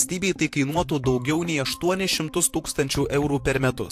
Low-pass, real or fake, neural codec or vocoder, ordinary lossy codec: 14.4 kHz; fake; vocoder, 44.1 kHz, 128 mel bands, Pupu-Vocoder; AAC, 64 kbps